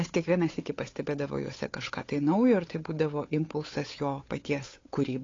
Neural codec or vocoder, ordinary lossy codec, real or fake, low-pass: codec, 16 kHz, 8 kbps, FunCodec, trained on Chinese and English, 25 frames a second; AAC, 32 kbps; fake; 7.2 kHz